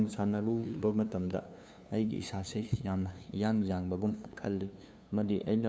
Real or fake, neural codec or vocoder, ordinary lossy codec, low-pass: fake; codec, 16 kHz, 2 kbps, FunCodec, trained on LibriTTS, 25 frames a second; none; none